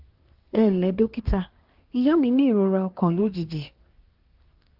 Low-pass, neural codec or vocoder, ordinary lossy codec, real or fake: 5.4 kHz; codec, 24 kHz, 1 kbps, SNAC; Opus, 32 kbps; fake